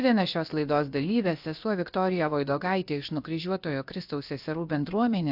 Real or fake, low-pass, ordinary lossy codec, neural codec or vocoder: fake; 5.4 kHz; AAC, 48 kbps; codec, 16 kHz, about 1 kbps, DyCAST, with the encoder's durations